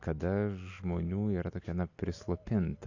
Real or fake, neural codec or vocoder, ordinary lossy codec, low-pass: real; none; AAC, 48 kbps; 7.2 kHz